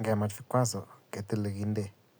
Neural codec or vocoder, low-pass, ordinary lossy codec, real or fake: none; none; none; real